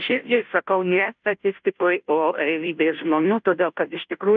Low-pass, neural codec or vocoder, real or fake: 7.2 kHz; codec, 16 kHz, 0.5 kbps, FunCodec, trained on Chinese and English, 25 frames a second; fake